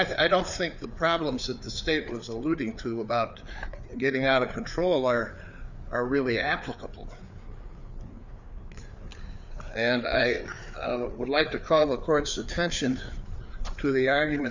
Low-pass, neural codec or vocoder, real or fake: 7.2 kHz; codec, 16 kHz, 4 kbps, FreqCodec, larger model; fake